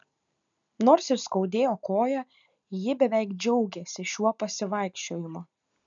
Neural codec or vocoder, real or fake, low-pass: none; real; 7.2 kHz